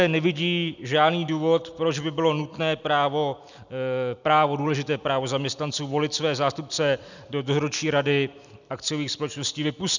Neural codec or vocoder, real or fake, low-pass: none; real; 7.2 kHz